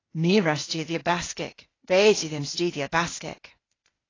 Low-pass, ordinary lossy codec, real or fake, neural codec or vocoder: 7.2 kHz; AAC, 32 kbps; fake; codec, 16 kHz, 0.8 kbps, ZipCodec